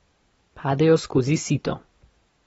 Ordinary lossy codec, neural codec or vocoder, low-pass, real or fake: AAC, 24 kbps; none; 19.8 kHz; real